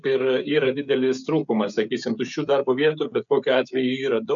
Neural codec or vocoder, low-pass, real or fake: codec, 16 kHz, 16 kbps, FreqCodec, smaller model; 7.2 kHz; fake